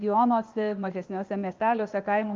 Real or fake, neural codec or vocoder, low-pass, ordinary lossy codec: fake; codec, 16 kHz, 0.8 kbps, ZipCodec; 7.2 kHz; Opus, 24 kbps